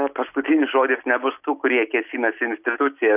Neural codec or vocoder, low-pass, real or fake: none; 3.6 kHz; real